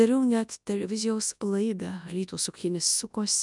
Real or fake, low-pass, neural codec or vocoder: fake; 10.8 kHz; codec, 24 kHz, 0.9 kbps, WavTokenizer, large speech release